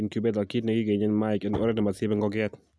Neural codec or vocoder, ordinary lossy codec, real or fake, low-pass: none; none; real; none